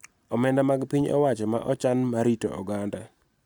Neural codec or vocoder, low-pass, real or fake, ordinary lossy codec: none; none; real; none